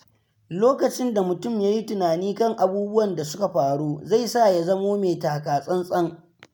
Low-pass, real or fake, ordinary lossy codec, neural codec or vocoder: none; real; none; none